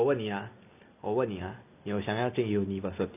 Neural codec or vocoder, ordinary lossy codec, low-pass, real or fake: none; none; 3.6 kHz; real